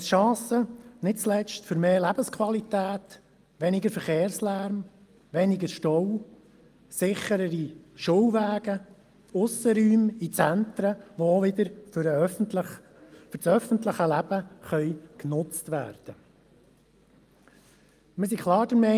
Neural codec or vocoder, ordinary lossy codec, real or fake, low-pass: none; Opus, 32 kbps; real; 14.4 kHz